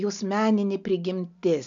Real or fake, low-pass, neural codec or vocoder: real; 7.2 kHz; none